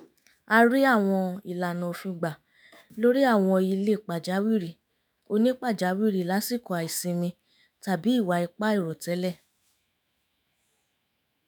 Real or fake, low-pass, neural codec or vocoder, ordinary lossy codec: fake; none; autoencoder, 48 kHz, 128 numbers a frame, DAC-VAE, trained on Japanese speech; none